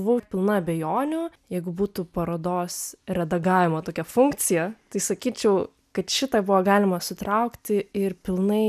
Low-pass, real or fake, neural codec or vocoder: 14.4 kHz; real; none